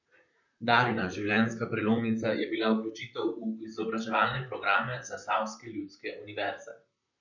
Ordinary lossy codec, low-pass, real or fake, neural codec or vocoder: none; 7.2 kHz; fake; vocoder, 44.1 kHz, 128 mel bands, Pupu-Vocoder